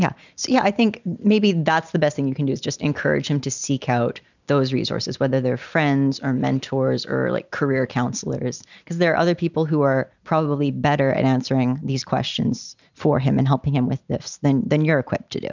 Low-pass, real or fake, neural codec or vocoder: 7.2 kHz; real; none